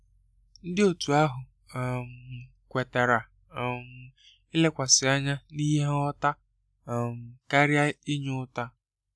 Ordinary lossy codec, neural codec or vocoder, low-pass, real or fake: none; none; none; real